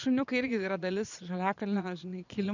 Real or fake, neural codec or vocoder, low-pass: fake; vocoder, 22.05 kHz, 80 mel bands, Vocos; 7.2 kHz